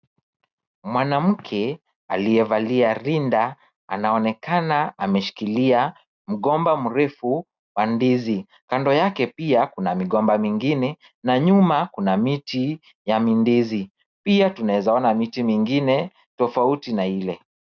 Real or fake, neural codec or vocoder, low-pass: real; none; 7.2 kHz